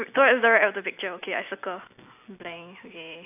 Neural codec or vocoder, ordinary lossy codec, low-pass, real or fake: none; AAC, 32 kbps; 3.6 kHz; real